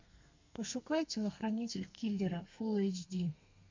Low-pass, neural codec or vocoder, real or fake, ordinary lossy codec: 7.2 kHz; codec, 32 kHz, 1.9 kbps, SNAC; fake; MP3, 48 kbps